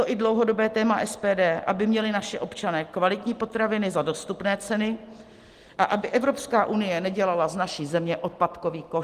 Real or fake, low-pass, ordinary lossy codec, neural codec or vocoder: real; 14.4 kHz; Opus, 24 kbps; none